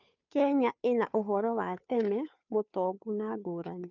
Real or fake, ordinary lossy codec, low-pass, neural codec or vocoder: fake; none; 7.2 kHz; codec, 16 kHz, 2 kbps, FunCodec, trained on Chinese and English, 25 frames a second